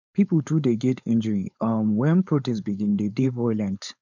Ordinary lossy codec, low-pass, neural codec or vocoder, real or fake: none; 7.2 kHz; codec, 16 kHz, 4.8 kbps, FACodec; fake